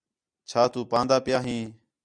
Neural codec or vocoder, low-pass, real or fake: none; 9.9 kHz; real